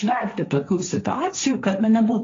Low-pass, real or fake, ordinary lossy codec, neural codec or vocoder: 7.2 kHz; fake; AAC, 32 kbps; codec, 16 kHz, 1.1 kbps, Voila-Tokenizer